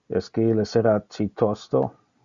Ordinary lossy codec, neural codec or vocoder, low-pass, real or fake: Opus, 64 kbps; none; 7.2 kHz; real